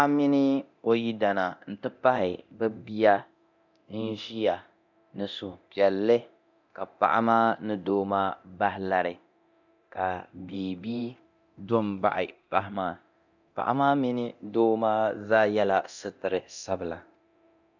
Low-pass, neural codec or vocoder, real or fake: 7.2 kHz; codec, 24 kHz, 0.9 kbps, DualCodec; fake